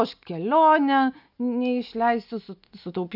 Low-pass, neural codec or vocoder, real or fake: 5.4 kHz; none; real